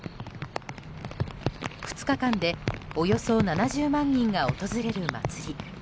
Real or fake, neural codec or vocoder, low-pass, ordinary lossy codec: real; none; none; none